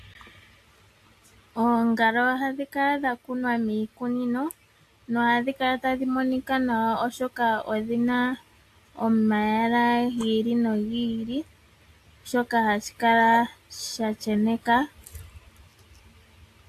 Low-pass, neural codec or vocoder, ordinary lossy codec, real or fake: 14.4 kHz; none; AAC, 64 kbps; real